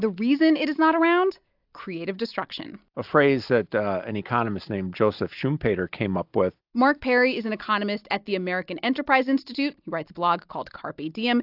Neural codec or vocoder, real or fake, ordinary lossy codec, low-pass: none; real; AAC, 48 kbps; 5.4 kHz